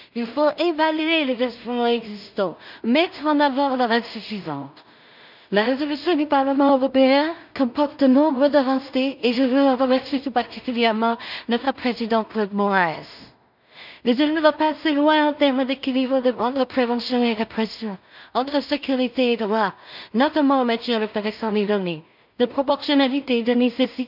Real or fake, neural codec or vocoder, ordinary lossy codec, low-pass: fake; codec, 16 kHz in and 24 kHz out, 0.4 kbps, LongCat-Audio-Codec, two codebook decoder; none; 5.4 kHz